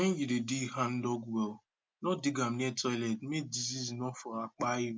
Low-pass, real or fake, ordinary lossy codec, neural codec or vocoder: none; real; none; none